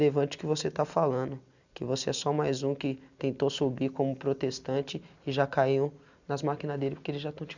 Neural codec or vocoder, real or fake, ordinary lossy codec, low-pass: none; real; none; 7.2 kHz